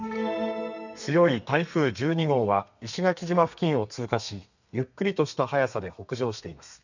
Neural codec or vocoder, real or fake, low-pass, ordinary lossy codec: codec, 32 kHz, 1.9 kbps, SNAC; fake; 7.2 kHz; none